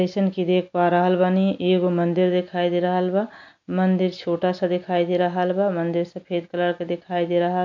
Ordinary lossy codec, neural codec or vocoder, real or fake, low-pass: MP3, 48 kbps; none; real; 7.2 kHz